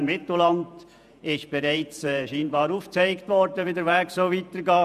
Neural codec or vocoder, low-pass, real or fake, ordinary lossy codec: vocoder, 48 kHz, 128 mel bands, Vocos; 14.4 kHz; fake; none